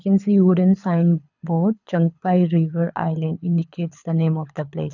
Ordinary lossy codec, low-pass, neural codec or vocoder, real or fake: none; 7.2 kHz; codec, 24 kHz, 6 kbps, HILCodec; fake